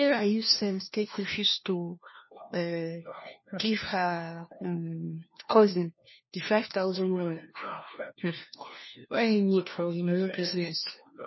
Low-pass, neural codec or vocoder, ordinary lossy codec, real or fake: 7.2 kHz; codec, 16 kHz, 1 kbps, FunCodec, trained on LibriTTS, 50 frames a second; MP3, 24 kbps; fake